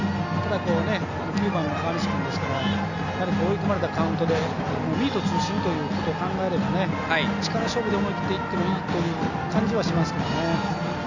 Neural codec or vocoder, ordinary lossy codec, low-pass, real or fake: none; none; 7.2 kHz; real